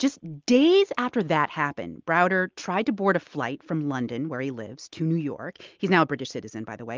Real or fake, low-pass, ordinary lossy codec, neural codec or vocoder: real; 7.2 kHz; Opus, 24 kbps; none